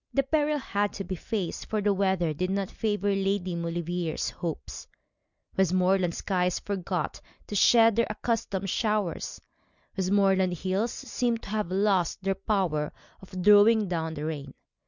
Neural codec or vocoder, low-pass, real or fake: none; 7.2 kHz; real